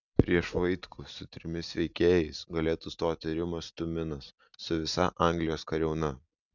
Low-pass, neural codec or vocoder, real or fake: 7.2 kHz; none; real